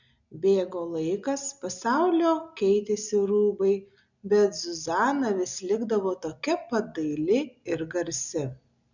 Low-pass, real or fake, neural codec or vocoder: 7.2 kHz; real; none